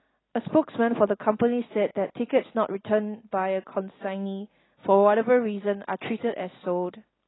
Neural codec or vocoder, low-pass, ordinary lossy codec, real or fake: none; 7.2 kHz; AAC, 16 kbps; real